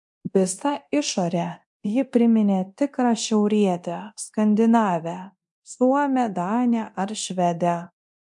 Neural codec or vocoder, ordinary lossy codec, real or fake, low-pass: codec, 24 kHz, 0.9 kbps, DualCodec; MP3, 64 kbps; fake; 10.8 kHz